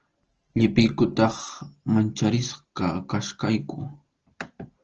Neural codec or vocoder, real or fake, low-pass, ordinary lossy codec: none; real; 7.2 kHz; Opus, 16 kbps